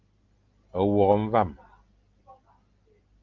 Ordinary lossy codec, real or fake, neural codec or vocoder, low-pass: Opus, 32 kbps; real; none; 7.2 kHz